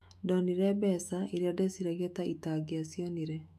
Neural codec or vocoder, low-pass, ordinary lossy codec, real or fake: autoencoder, 48 kHz, 128 numbers a frame, DAC-VAE, trained on Japanese speech; 14.4 kHz; AAC, 96 kbps; fake